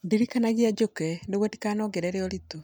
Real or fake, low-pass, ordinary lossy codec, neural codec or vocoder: real; none; none; none